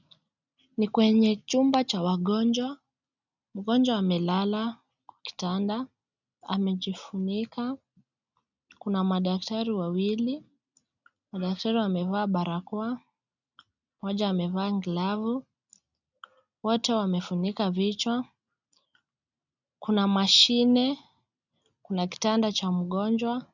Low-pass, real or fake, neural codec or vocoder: 7.2 kHz; real; none